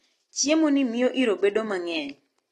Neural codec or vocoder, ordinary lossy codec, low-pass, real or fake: none; AAC, 32 kbps; 19.8 kHz; real